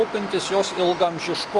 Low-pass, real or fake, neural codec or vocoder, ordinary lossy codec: 10.8 kHz; real; none; Opus, 24 kbps